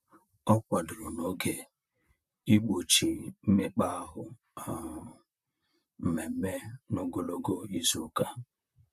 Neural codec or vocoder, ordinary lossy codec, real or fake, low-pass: vocoder, 44.1 kHz, 128 mel bands, Pupu-Vocoder; none; fake; 14.4 kHz